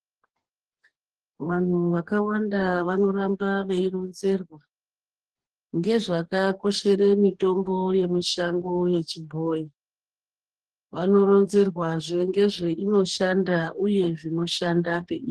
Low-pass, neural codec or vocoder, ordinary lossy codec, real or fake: 10.8 kHz; codec, 44.1 kHz, 2.6 kbps, DAC; Opus, 16 kbps; fake